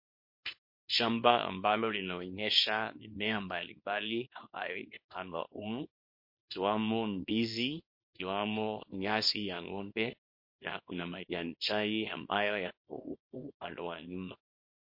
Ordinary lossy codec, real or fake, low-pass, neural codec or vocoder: MP3, 32 kbps; fake; 5.4 kHz; codec, 24 kHz, 0.9 kbps, WavTokenizer, small release